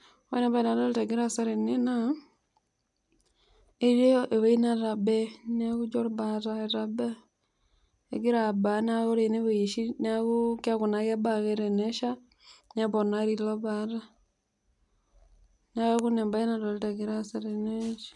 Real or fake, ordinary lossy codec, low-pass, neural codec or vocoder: real; none; 10.8 kHz; none